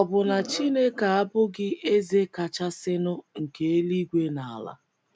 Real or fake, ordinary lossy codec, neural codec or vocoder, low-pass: real; none; none; none